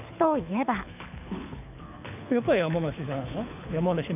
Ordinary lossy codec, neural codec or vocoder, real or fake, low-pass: none; codec, 24 kHz, 6 kbps, HILCodec; fake; 3.6 kHz